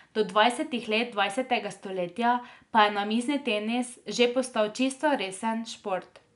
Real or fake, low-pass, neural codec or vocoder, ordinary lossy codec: real; 10.8 kHz; none; none